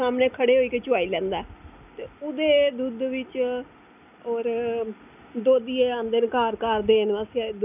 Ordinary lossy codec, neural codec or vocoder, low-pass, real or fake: none; none; 3.6 kHz; real